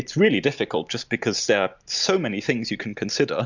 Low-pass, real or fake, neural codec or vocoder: 7.2 kHz; real; none